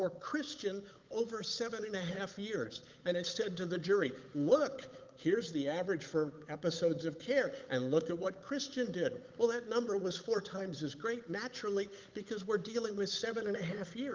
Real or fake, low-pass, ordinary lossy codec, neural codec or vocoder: fake; 7.2 kHz; Opus, 24 kbps; codec, 16 kHz, 8 kbps, FunCodec, trained on Chinese and English, 25 frames a second